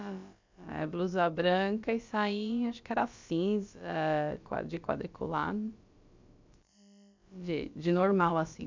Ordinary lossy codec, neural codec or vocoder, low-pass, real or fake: MP3, 64 kbps; codec, 16 kHz, about 1 kbps, DyCAST, with the encoder's durations; 7.2 kHz; fake